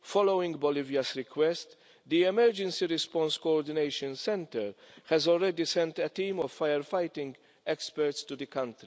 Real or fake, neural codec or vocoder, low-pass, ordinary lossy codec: real; none; none; none